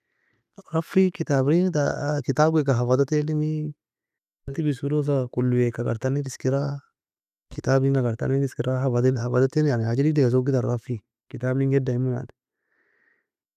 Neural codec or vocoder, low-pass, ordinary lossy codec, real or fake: none; 14.4 kHz; none; real